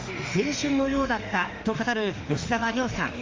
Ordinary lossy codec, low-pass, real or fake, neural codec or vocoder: Opus, 32 kbps; 7.2 kHz; fake; autoencoder, 48 kHz, 32 numbers a frame, DAC-VAE, trained on Japanese speech